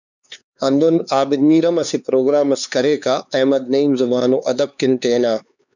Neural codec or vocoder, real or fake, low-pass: codec, 16 kHz, 4 kbps, X-Codec, HuBERT features, trained on LibriSpeech; fake; 7.2 kHz